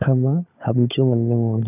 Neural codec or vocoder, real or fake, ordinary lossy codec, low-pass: codec, 16 kHz, 16 kbps, FunCodec, trained on LibriTTS, 50 frames a second; fake; none; 3.6 kHz